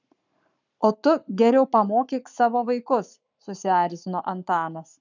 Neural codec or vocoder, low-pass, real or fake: codec, 44.1 kHz, 7.8 kbps, Pupu-Codec; 7.2 kHz; fake